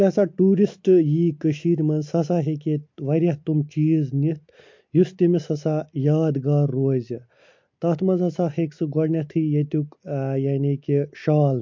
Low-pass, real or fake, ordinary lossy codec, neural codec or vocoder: 7.2 kHz; real; MP3, 48 kbps; none